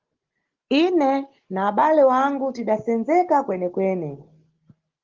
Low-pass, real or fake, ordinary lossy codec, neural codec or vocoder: 7.2 kHz; real; Opus, 16 kbps; none